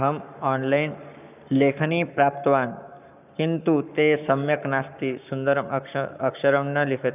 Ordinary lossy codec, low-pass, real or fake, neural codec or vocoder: none; 3.6 kHz; fake; codec, 44.1 kHz, 7.8 kbps, Pupu-Codec